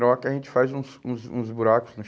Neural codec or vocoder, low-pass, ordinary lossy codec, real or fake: none; none; none; real